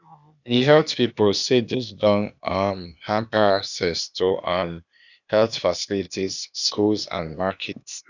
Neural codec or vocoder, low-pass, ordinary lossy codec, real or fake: codec, 16 kHz, 0.8 kbps, ZipCodec; 7.2 kHz; none; fake